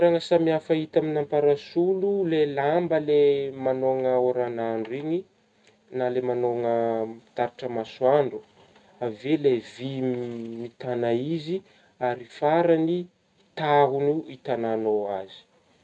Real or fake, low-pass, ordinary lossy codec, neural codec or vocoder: real; 10.8 kHz; MP3, 96 kbps; none